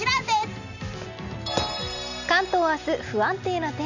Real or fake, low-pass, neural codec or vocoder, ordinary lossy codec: real; 7.2 kHz; none; none